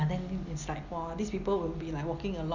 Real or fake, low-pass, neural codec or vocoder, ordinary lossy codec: real; 7.2 kHz; none; none